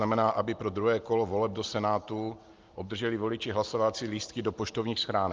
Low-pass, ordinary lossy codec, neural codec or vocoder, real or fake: 7.2 kHz; Opus, 32 kbps; none; real